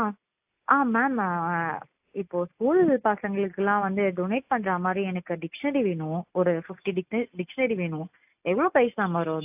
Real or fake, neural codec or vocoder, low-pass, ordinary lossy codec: real; none; 3.6 kHz; AAC, 32 kbps